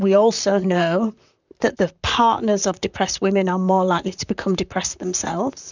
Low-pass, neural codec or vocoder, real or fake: 7.2 kHz; vocoder, 44.1 kHz, 128 mel bands, Pupu-Vocoder; fake